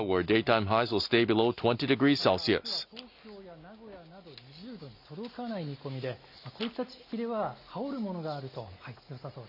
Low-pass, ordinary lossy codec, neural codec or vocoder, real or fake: 5.4 kHz; none; none; real